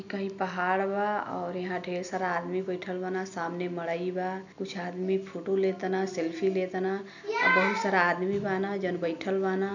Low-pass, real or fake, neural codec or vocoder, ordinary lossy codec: 7.2 kHz; real; none; none